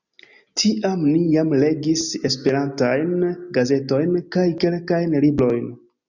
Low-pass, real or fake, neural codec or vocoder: 7.2 kHz; real; none